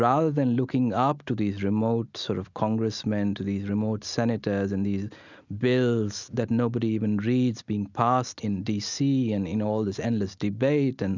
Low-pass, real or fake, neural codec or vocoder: 7.2 kHz; real; none